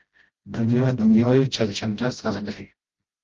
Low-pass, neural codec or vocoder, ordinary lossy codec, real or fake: 7.2 kHz; codec, 16 kHz, 0.5 kbps, FreqCodec, smaller model; Opus, 32 kbps; fake